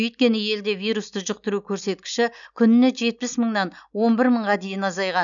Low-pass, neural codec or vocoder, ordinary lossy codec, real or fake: 7.2 kHz; none; none; real